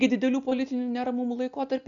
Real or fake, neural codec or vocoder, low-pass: real; none; 7.2 kHz